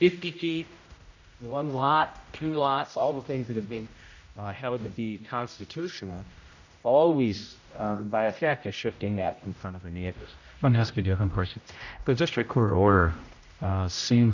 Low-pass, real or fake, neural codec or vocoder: 7.2 kHz; fake; codec, 16 kHz, 0.5 kbps, X-Codec, HuBERT features, trained on general audio